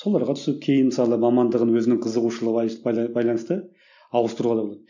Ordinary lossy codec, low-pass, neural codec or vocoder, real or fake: none; 7.2 kHz; none; real